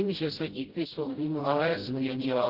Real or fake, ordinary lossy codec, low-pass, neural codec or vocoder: fake; Opus, 16 kbps; 5.4 kHz; codec, 16 kHz, 0.5 kbps, FreqCodec, smaller model